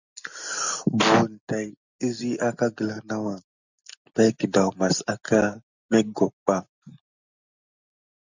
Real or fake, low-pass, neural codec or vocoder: real; 7.2 kHz; none